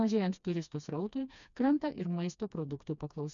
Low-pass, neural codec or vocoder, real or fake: 7.2 kHz; codec, 16 kHz, 2 kbps, FreqCodec, smaller model; fake